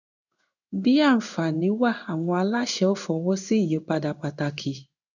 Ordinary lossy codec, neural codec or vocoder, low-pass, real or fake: none; codec, 16 kHz in and 24 kHz out, 1 kbps, XY-Tokenizer; 7.2 kHz; fake